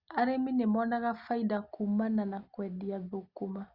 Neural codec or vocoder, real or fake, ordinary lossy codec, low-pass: none; real; Opus, 64 kbps; 5.4 kHz